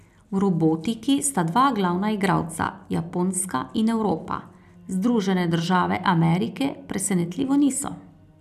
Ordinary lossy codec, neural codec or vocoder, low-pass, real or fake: none; none; 14.4 kHz; real